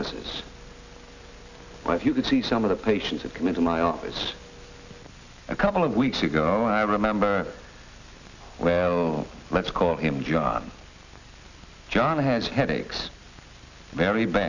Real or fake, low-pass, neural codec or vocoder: real; 7.2 kHz; none